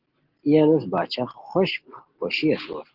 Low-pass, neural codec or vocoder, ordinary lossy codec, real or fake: 5.4 kHz; none; Opus, 16 kbps; real